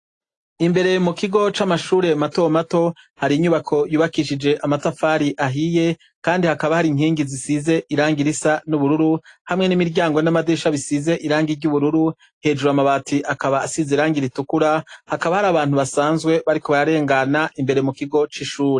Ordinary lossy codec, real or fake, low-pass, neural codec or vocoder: AAC, 48 kbps; real; 10.8 kHz; none